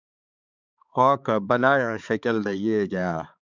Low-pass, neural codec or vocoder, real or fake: 7.2 kHz; codec, 16 kHz, 4 kbps, X-Codec, HuBERT features, trained on balanced general audio; fake